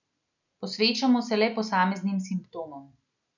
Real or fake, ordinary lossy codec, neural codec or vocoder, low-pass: real; none; none; 7.2 kHz